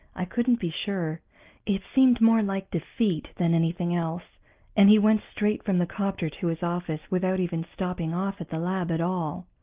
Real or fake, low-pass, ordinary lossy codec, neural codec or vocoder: real; 3.6 kHz; Opus, 32 kbps; none